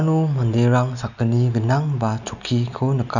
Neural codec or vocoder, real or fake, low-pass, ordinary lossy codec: none; real; 7.2 kHz; none